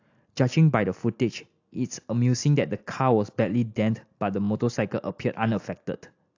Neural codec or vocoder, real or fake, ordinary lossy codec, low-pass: none; real; AAC, 48 kbps; 7.2 kHz